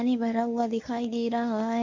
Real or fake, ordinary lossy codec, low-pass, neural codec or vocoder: fake; MP3, 48 kbps; 7.2 kHz; codec, 24 kHz, 0.9 kbps, WavTokenizer, medium speech release version 1